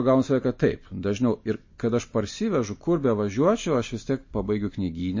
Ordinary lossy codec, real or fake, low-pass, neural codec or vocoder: MP3, 32 kbps; real; 7.2 kHz; none